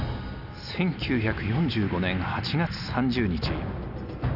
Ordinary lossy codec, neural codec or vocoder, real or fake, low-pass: none; none; real; 5.4 kHz